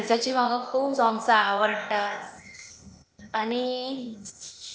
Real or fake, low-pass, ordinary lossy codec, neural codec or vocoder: fake; none; none; codec, 16 kHz, 0.8 kbps, ZipCodec